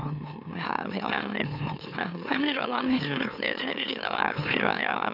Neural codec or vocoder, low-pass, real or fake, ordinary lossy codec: autoencoder, 44.1 kHz, a latent of 192 numbers a frame, MeloTTS; 5.4 kHz; fake; none